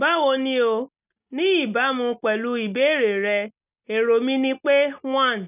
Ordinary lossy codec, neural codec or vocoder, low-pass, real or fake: none; none; 3.6 kHz; real